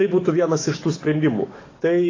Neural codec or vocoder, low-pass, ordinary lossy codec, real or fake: codec, 44.1 kHz, 7.8 kbps, DAC; 7.2 kHz; AAC, 32 kbps; fake